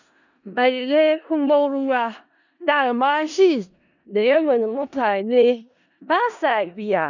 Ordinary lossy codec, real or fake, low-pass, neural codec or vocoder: none; fake; 7.2 kHz; codec, 16 kHz in and 24 kHz out, 0.4 kbps, LongCat-Audio-Codec, four codebook decoder